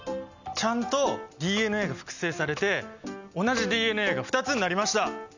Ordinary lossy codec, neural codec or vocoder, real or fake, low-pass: none; none; real; 7.2 kHz